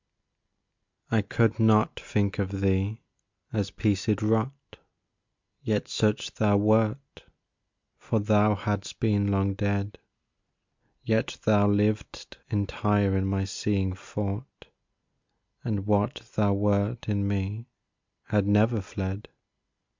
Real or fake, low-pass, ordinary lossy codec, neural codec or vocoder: real; 7.2 kHz; MP3, 64 kbps; none